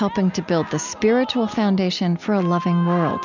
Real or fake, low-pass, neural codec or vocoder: real; 7.2 kHz; none